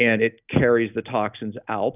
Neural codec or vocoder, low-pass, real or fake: none; 3.6 kHz; real